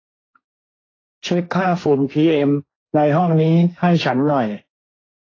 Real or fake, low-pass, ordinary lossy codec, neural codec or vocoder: fake; 7.2 kHz; AAC, 32 kbps; codec, 16 kHz, 1.1 kbps, Voila-Tokenizer